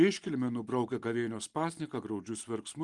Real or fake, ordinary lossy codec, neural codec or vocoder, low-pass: real; Opus, 24 kbps; none; 10.8 kHz